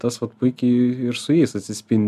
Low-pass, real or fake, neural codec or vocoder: 14.4 kHz; real; none